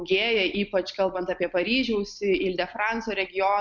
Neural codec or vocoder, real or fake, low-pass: none; real; 7.2 kHz